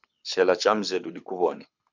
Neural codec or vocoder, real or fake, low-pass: codec, 24 kHz, 6 kbps, HILCodec; fake; 7.2 kHz